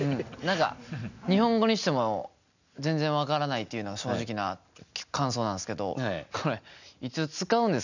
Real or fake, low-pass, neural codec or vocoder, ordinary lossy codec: real; 7.2 kHz; none; none